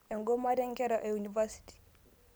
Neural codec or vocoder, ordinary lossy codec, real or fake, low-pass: none; none; real; none